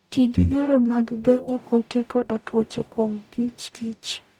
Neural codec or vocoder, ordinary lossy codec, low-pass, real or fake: codec, 44.1 kHz, 0.9 kbps, DAC; none; 19.8 kHz; fake